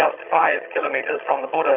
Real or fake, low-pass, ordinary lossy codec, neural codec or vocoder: fake; 3.6 kHz; MP3, 32 kbps; vocoder, 22.05 kHz, 80 mel bands, HiFi-GAN